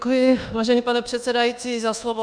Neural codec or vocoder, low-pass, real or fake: codec, 24 kHz, 1.2 kbps, DualCodec; 9.9 kHz; fake